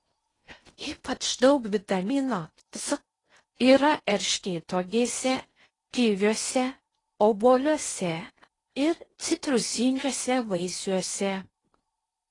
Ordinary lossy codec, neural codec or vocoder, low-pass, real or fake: AAC, 32 kbps; codec, 16 kHz in and 24 kHz out, 0.8 kbps, FocalCodec, streaming, 65536 codes; 10.8 kHz; fake